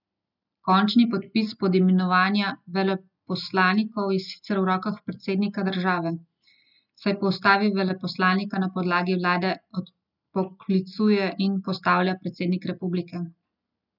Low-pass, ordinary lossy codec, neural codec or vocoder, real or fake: 5.4 kHz; none; none; real